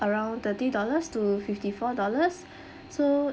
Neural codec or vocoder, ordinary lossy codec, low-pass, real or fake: none; none; none; real